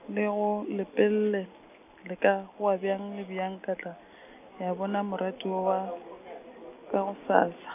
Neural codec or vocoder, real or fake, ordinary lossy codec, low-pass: none; real; none; 3.6 kHz